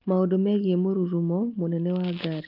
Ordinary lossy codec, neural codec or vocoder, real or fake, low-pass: Opus, 24 kbps; none; real; 5.4 kHz